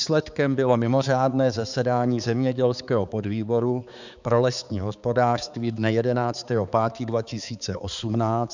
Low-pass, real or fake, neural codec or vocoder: 7.2 kHz; fake; codec, 16 kHz, 4 kbps, X-Codec, HuBERT features, trained on balanced general audio